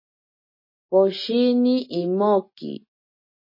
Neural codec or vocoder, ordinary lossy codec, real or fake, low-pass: none; MP3, 24 kbps; real; 5.4 kHz